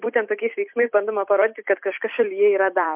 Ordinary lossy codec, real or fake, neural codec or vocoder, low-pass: MP3, 32 kbps; fake; vocoder, 44.1 kHz, 128 mel bands every 256 samples, BigVGAN v2; 3.6 kHz